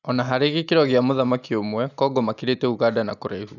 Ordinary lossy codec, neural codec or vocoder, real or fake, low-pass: none; none; real; 7.2 kHz